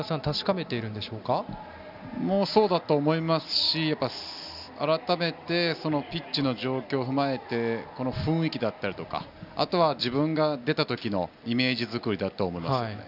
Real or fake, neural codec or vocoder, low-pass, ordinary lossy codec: real; none; 5.4 kHz; none